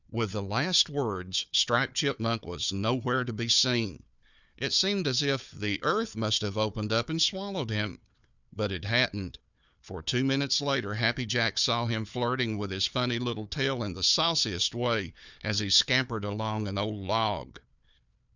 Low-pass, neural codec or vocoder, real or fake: 7.2 kHz; codec, 16 kHz, 4 kbps, FunCodec, trained on Chinese and English, 50 frames a second; fake